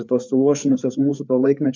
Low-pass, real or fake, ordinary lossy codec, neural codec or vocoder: 7.2 kHz; fake; MP3, 64 kbps; codec, 16 kHz, 4 kbps, FreqCodec, larger model